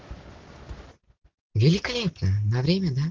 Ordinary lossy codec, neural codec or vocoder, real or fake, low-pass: Opus, 16 kbps; none; real; 7.2 kHz